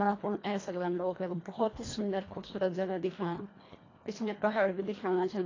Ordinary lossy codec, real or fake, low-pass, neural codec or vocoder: AAC, 32 kbps; fake; 7.2 kHz; codec, 24 kHz, 1.5 kbps, HILCodec